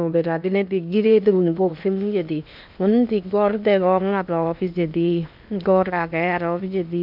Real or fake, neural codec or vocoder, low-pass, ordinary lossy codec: fake; codec, 16 kHz in and 24 kHz out, 0.8 kbps, FocalCodec, streaming, 65536 codes; 5.4 kHz; none